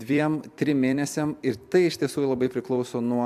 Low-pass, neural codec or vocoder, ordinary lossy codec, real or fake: 14.4 kHz; vocoder, 44.1 kHz, 128 mel bands every 256 samples, BigVGAN v2; MP3, 96 kbps; fake